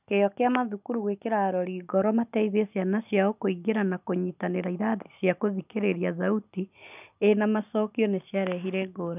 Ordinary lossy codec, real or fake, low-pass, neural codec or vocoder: none; real; 3.6 kHz; none